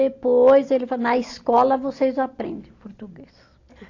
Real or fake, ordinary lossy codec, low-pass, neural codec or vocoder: real; AAC, 48 kbps; 7.2 kHz; none